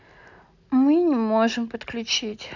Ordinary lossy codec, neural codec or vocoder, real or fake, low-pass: none; none; real; 7.2 kHz